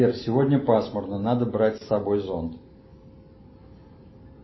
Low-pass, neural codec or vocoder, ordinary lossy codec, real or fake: 7.2 kHz; none; MP3, 24 kbps; real